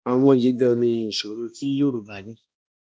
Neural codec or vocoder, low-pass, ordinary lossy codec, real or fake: codec, 16 kHz, 1 kbps, X-Codec, HuBERT features, trained on balanced general audio; none; none; fake